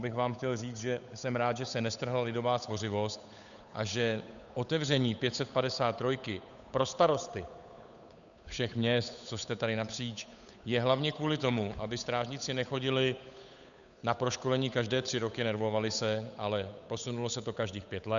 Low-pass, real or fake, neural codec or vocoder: 7.2 kHz; fake; codec, 16 kHz, 8 kbps, FunCodec, trained on Chinese and English, 25 frames a second